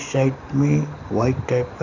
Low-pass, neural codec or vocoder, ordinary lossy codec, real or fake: 7.2 kHz; none; none; real